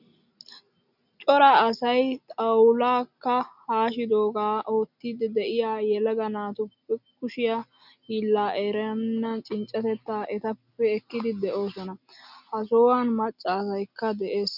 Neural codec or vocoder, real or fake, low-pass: none; real; 5.4 kHz